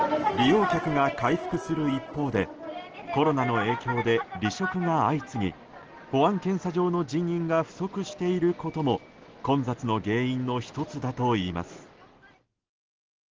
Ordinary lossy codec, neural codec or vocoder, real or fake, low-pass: Opus, 16 kbps; none; real; 7.2 kHz